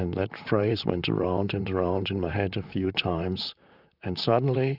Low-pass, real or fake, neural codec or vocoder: 5.4 kHz; fake; vocoder, 44.1 kHz, 128 mel bands, Pupu-Vocoder